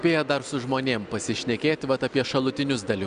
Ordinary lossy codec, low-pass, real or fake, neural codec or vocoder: Opus, 64 kbps; 9.9 kHz; real; none